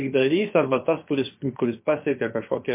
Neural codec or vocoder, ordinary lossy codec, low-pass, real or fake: codec, 16 kHz, about 1 kbps, DyCAST, with the encoder's durations; MP3, 24 kbps; 3.6 kHz; fake